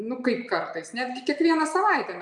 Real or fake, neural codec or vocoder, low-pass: real; none; 10.8 kHz